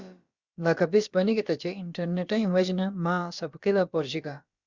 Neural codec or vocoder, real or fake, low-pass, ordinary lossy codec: codec, 16 kHz, about 1 kbps, DyCAST, with the encoder's durations; fake; 7.2 kHz; Opus, 64 kbps